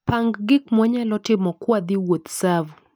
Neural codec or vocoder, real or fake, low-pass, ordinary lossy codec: none; real; none; none